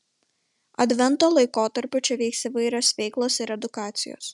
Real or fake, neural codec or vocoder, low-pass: real; none; 10.8 kHz